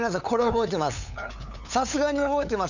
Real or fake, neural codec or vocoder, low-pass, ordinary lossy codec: fake; codec, 16 kHz, 8 kbps, FunCodec, trained on LibriTTS, 25 frames a second; 7.2 kHz; none